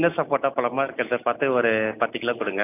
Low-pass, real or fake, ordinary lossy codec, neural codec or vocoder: 3.6 kHz; real; none; none